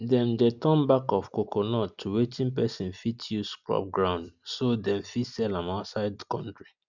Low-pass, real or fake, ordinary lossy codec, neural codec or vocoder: 7.2 kHz; real; none; none